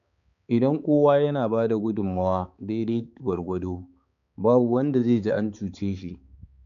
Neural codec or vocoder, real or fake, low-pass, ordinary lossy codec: codec, 16 kHz, 4 kbps, X-Codec, HuBERT features, trained on balanced general audio; fake; 7.2 kHz; none